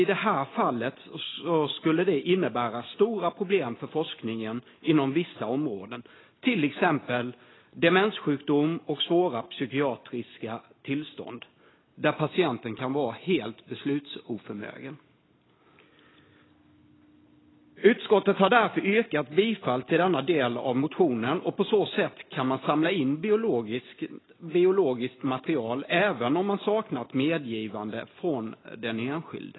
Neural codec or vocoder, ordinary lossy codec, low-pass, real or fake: none; AAC, 16 kbps; 7.2 kHz; real